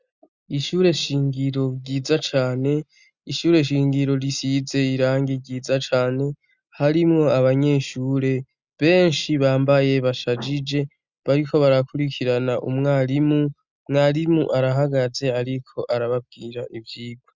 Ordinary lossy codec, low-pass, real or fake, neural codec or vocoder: Opus, 64 kbps; 7.2 kHz; real; none